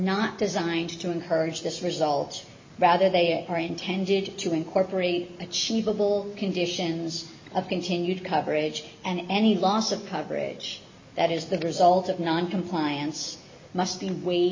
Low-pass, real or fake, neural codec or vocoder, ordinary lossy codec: 7.2 kHz; real; none; MP3, 32 kbps